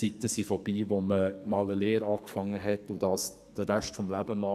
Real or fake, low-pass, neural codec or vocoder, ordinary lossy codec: fake; 14.4 kHz; codec, 44.1 kHz, 2.6 kbps, SNAC; none